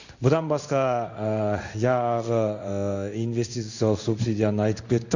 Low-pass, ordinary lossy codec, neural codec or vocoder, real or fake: 7.2 kHz; none; codec, 16 kHz in and 24 kHz out, 1 kbps, XY-Tokenizer; fake